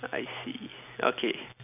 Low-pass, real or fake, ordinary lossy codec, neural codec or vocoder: 3.6 kHz; real; none; none